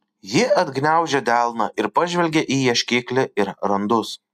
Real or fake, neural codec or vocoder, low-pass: real; none; 14.4 kHz